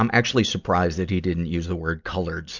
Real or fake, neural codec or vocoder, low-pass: real; none; 7.2 kHz